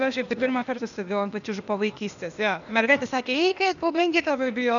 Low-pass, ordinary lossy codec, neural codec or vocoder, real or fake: 7.2 kHz; MP3, 96 kbps; codec, 16 kHz, 0.8 kbps, ZipCodec; fake